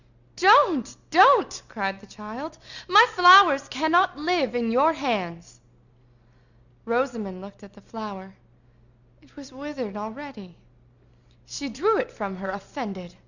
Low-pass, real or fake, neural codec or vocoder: 7.2 kHz; real; none